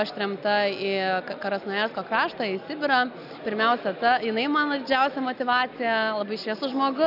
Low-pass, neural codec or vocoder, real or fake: 5.4 kHz; none; real